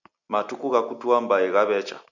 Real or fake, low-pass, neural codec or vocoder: real; 7.2 kHz; none